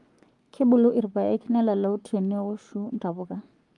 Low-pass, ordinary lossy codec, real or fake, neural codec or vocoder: 10.8 kHz; Opus, 32 kbps; fake; codec, 44.1 kHz, 7.8 kbps, Pupu-Codec